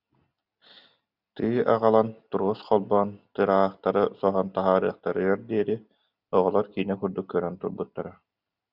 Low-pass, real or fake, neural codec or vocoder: 5.4 kHz; real; none